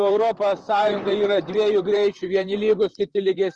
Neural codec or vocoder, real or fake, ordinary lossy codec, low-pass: vocoder, 44.1 kHz, 128 mel bands, Pupu-Vocoder; fake; Opus, 24 kbps; 10.8 kHz